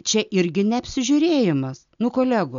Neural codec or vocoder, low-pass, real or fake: none; 7.2 kHz; real